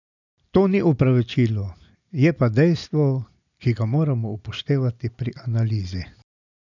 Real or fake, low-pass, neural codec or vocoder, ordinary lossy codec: real; 7.2 kHz; none; none